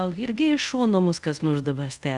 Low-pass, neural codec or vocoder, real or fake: 10.8 kHz; codec, 24 kHz, 0.5 kbps, DualCodec; fake